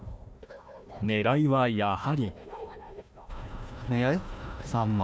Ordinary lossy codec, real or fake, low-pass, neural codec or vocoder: none; fake; none; codec, 16 kHz, 1 kbps, FunCodec, trained on Chinese and English, 50 frames a second